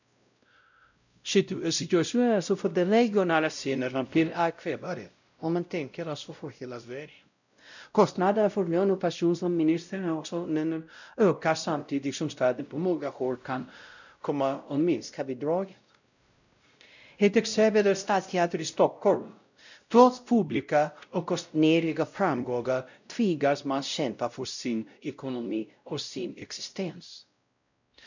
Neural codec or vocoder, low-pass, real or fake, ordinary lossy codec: codec, 16 kHz, 0.5 kbps, X-Codec, WavLM features, trained on Multilingual LibriSpeech; 7.2 kHz; fake; none